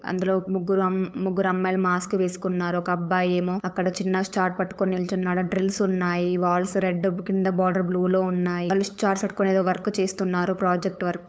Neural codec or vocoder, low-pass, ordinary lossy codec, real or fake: codec, 16 kHz, 8 kbps, FunCodec, trained on LibriTTS, 25 frames a second; none; none; fake